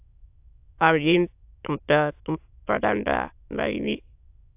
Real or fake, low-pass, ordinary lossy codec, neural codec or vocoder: fake; 3.6 kHz; AAC, 32 kbps; autoencoder, 22.05 kHz, a latent of 192 numbers a frame, VITS, trained on many speakers